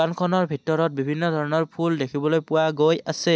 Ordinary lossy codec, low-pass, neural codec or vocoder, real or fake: none; none; none; real